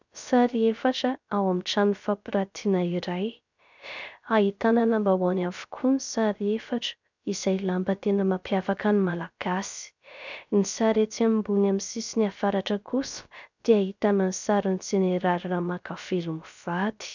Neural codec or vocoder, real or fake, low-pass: codec, 16 kHz, 0.3 kbps, FocalCodec; fake; 7.2 kHz